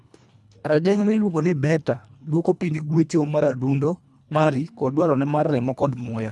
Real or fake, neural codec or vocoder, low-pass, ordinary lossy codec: fake; codec, 24 kHz, 1.5 kbps, HILCodec; none; none